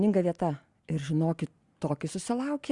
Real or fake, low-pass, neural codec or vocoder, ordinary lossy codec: real; 10.8 kHz; none; Opus, 64 kbps